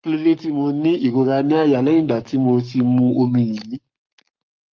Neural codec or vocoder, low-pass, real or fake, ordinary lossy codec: codec, 44.1 kHz, 7.8 kbps, Pupu-Codec; 7.2 kHz; fake; Opus, 32 kbps